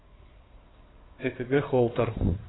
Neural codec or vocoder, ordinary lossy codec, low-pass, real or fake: codec, 16 kHz in and 24 kHz out, 2.2 kbps, FireRedTTS-2 codec; AAC, 16 kbps; 7.2 kHz; fake